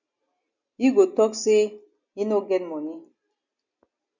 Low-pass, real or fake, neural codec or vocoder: 7.2 kHz; real; none